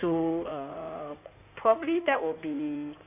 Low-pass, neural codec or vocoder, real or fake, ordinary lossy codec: 3.6 kHz; codec, 16 kHz in and 24 kHz out, 2.2 kbps, FireRedTTS-2 codec; fake; AAC, 32 kbps